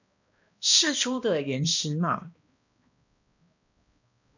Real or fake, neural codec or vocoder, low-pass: fake; codec, 16 kHz, 1 kbps, X-Codec, HuBERT features, trained on balanced general audio; 7.2 kHz